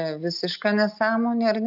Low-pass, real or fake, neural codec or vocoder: 5.4 kHz; real; none